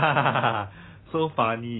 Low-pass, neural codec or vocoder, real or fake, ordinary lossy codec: 7.2 kHz; none; real; AAC, 16 kbps